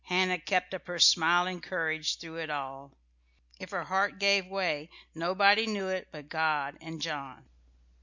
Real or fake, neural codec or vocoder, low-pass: real; none; 7.2 kHz